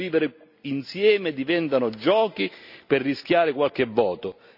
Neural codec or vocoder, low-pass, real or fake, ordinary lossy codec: none; 5.4 kHz; real; none